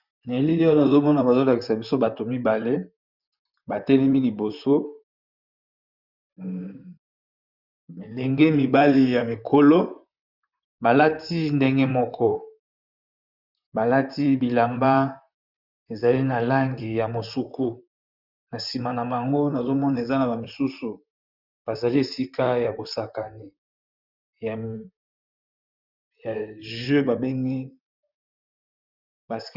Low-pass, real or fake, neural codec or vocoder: 5.4 kHz; fake; vocoder, 44.1 kHz, 128 mel bands, Pupu-Vocoder